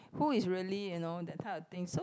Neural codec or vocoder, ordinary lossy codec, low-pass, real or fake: none; none; none; real